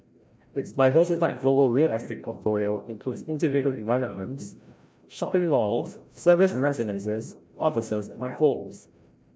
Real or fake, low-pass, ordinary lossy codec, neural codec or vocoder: fake; none; none; codec, 16 kHz, 0.5 kbps, FreqCodec, larger model